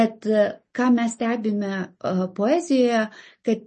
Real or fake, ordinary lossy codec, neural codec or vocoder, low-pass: real; MP3, 32 kbps; none; 10.8 kHz